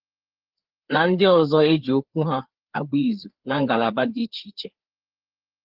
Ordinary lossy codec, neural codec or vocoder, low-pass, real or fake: Opus, 16 kbps; codec, 16 kHz, 4 kbps, FreqCodec, larger model; 5.4 kHz; fake